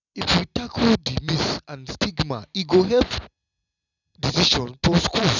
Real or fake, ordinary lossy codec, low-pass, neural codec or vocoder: real; none; 7.2 kHz; none